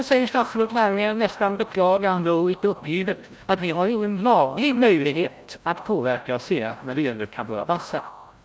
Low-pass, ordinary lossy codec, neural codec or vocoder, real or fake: none; none; codec, 16 kHz, 0.5 kbps, FreqCodec, larger model; fake